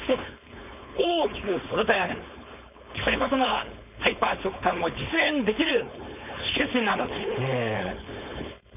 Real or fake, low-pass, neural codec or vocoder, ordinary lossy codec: fake; 3.6 kHz; codec, 16 kHz, 4.8 kbps, FACodec; none